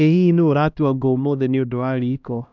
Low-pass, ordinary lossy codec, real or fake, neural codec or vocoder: 7.2 kHz; none; fake; codec, 16 kHz, 1 kbps, X-Codec, HuBERT features, trained on LibriSpeech